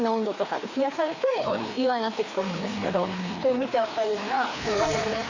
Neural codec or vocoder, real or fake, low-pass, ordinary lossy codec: codec, 16 kHz, 2 kbps, FreqCodec, larger model; fake; 7.2 kHz; none